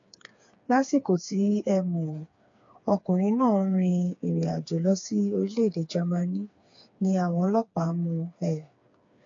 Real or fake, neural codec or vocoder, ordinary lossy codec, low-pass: fake; codec, 16 kHz, 4 kbps, FreqCodec, smaller model; AAC, 48 kbps; 7.2 kHz